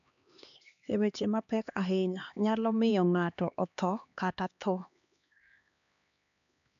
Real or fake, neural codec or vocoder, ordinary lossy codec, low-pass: fake; codec, 16 kHz, 2 kbps, X-Codec, HuBERT features, trained on LibriSpeech; AAC, 96 kbps; 7.2 kHz